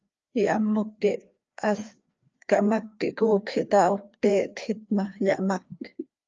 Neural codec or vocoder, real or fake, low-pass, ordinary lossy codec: codec, 16 kHz, 2 kbps, FreqCodec, larger model; fake; 7.2 kHz; Opus, 24 kbps